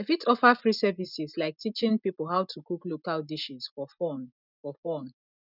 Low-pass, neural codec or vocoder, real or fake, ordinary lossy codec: 5.4 kHz; none; real; none